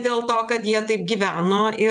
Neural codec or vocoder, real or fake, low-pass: vocoder, 22.05 kHz, 80 mel bands, WaveNeXt; fake; 9.9 kHz